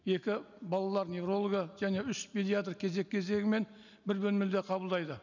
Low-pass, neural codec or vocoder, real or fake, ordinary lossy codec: 7.2 kHz; none; real; none